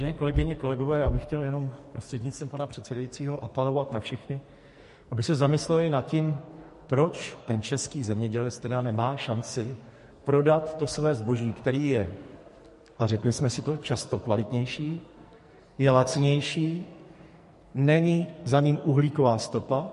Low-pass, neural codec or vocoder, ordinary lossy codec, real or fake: 14.4 kHz; codec, 44.1 kHz, 2.6 kbps, SNAC; MP3, 48 kbps; fake